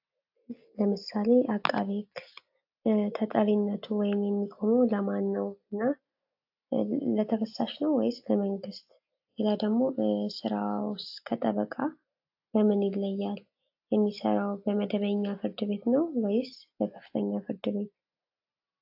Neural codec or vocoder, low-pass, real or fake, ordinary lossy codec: none; 5.4 kHz; real; AAC, 48 kbps